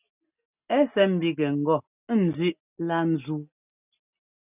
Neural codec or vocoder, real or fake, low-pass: none; real; 3.6 kHz